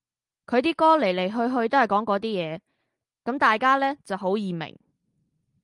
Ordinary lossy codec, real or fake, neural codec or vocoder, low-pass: Opus, 32 kbps; real; none; 10.8 kHz